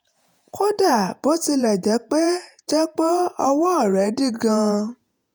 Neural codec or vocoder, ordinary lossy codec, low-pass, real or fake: vocoder, 48 kHz, 128 mel bands, Vocos; none; none; fake